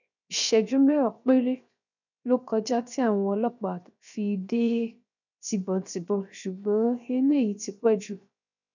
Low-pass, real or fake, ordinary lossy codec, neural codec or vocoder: 7.2 kHz; fake; none; codec, 16 kHz, 0.7 kbps, FocalCodec